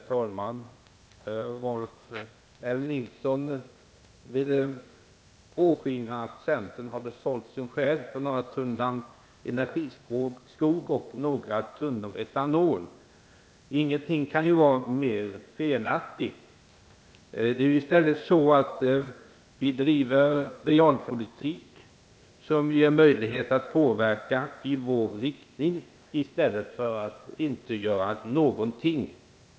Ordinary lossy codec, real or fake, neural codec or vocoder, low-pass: none; fake; codec, 16 kHz, 0.8 kbps, ZipCodec; none